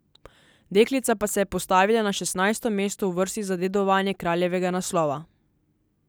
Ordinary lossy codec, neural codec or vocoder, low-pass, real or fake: none; none; none; real